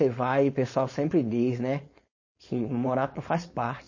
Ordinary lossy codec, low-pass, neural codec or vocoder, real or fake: MP3, 32 kbps; 7.2 kHz; codec, 16 kHz, 4.8 kbps, FACodec; fake